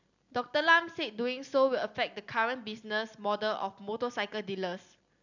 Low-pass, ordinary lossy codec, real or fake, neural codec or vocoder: 7.2 kHz; none; real; none